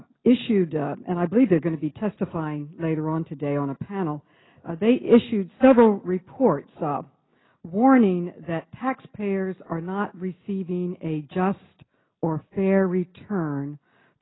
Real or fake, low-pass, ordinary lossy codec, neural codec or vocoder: real; 7.2 kHz; AAC, 16 kbps; none